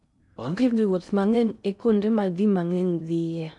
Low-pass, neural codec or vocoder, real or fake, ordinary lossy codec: 10.8 kHz; codec, 16 kHz in and 24 kHz out, 0.6 kbps, FocalCodec, streaming, 2048 codes; fake; none